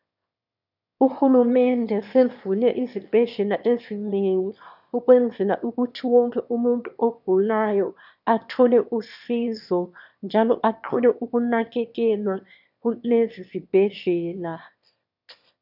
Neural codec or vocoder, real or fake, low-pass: autoencoder, 22.05 kHz, a latent of 192 numbers a frame, VITS, trained on one speaker; fake; 5.4 kHz